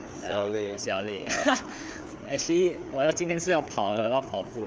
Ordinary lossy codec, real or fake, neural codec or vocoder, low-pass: none; fake; codec, 16 kHz, 4 kbps, FreqCodec, larger model; none